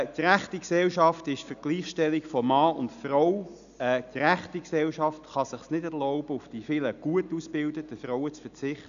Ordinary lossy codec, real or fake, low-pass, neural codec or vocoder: none; real; 7.2 kHz; none